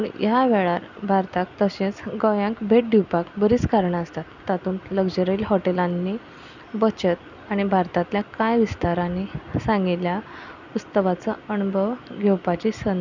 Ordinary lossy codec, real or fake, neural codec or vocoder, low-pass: none; real; none; 7.2 kHz